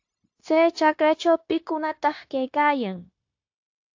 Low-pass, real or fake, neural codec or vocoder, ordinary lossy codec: 7.2 kHz; fake; codec, 16 kHz, 0.9 kbps, LongCat-Audio-Codec; AAC, 48 kbps